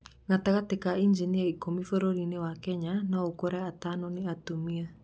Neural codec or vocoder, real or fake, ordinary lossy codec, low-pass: none; real; none; none